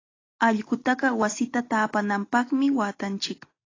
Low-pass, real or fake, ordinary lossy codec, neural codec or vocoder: 7.2 kHz; real; AAC, 32 kbps; none